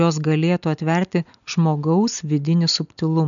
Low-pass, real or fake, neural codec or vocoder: 7.2 kHz; real; none